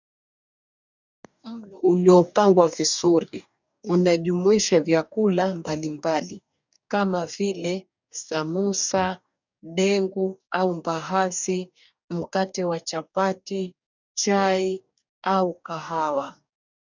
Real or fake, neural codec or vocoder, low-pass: fake; codec, 44.1 kHz, 2.6 kbps, DAC; 7.2 kHz